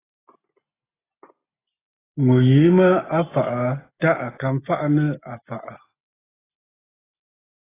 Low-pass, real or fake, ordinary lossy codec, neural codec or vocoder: 3.6 kHz; real; AAC, 16 kbps; none